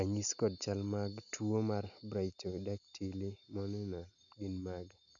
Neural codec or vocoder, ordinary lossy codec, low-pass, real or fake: none; none; 7.2 kHz; real